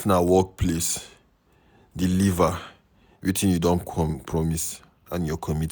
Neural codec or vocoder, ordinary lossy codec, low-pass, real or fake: none; none; none; real